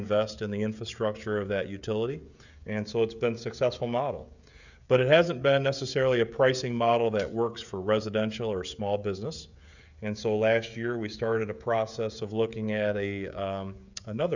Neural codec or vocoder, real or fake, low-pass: codec, 16 kHz, 16 kbps, FreqCodec, smaller model; fake; 7.2 kHz